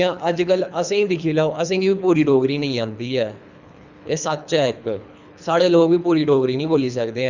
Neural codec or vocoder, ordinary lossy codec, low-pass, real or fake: codec, 24 kHz, 3 kbps, HILCodec; none; 7.2 kHz; fake